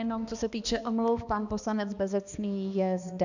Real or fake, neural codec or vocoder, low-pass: fake; codec, 16 kHz, 2 kbps, X-Codec, HuBERT features, trained on balanced general audio; 7.2 kHz